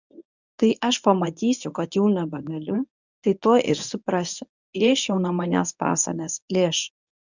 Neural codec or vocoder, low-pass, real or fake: codec, 24 kHz, 0.9 kbps, WavTokenizer, medium speech release version 1; 7.2 kHz; fake